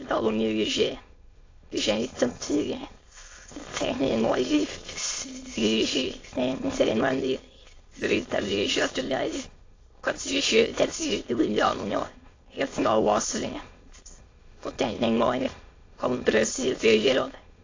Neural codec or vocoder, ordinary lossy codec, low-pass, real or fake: autoencoder, 22.05 kHz, a latent of 192 numbers a frame, VITS, trained on many speakers; AAC, 32 kbps; 7.2 kHz; fake